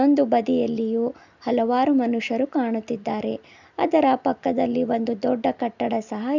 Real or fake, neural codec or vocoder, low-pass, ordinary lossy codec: real; none; 7.2 kHz; none